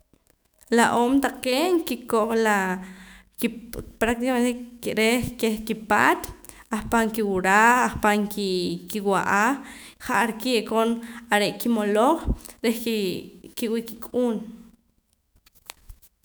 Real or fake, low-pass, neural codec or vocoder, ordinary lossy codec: fake; none; autoencoder, 48 kHz, 128 numbers a frame, DAC-VAE, trained on Japanese speech; none